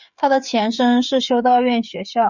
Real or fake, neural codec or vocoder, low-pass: fake; codec, 16 kHz, 16 kbps, FreqCodec, smaller model; 7.2 kHz